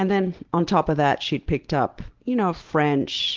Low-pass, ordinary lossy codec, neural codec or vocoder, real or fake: 7.2 kHz; Opus, 16 kbps; none; real